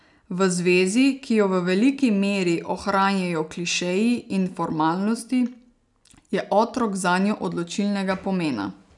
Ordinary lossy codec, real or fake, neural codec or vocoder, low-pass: none; real; none; 10.8 kHz